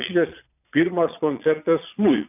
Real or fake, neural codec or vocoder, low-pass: fake; vocoder, 22.05 kHz, 80 mel bands, Vocos; 3.6 kHz